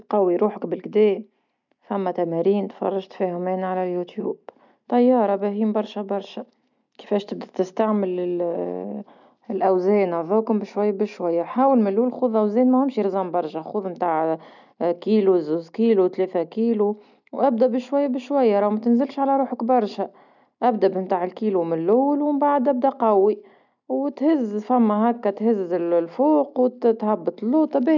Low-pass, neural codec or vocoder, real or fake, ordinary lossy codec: 7.2 kHz; none; real; none